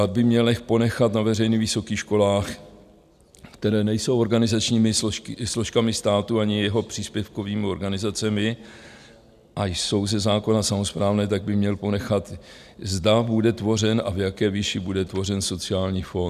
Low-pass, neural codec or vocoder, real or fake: 14.4 kHz; none; real